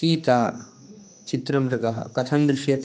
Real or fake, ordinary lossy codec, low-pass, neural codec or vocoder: fake; none; none; codec, 16 kHz, 2 kbps, X-Codec, HuBERT features, trained on general audio